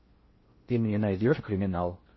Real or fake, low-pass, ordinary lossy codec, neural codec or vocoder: fake; 7.2 kHz; MP3, 24 kbps; codec, 16 kHz in and 24 kHz out, 0.6 kbps, FocalCodec, streaming, 4096 codes